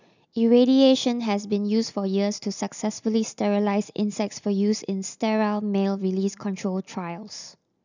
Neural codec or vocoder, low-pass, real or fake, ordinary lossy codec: none; 7.2 kHz; real; none